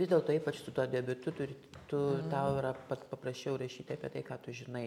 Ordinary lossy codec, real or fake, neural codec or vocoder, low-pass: MP3, 96 kbps; real; none; 19.8 kHz